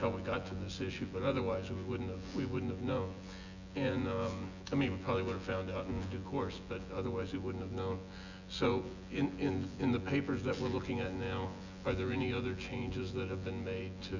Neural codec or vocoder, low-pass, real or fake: vocoder, 24 kHz, 100 mel bands, Vocos; 7.2 kHz; fake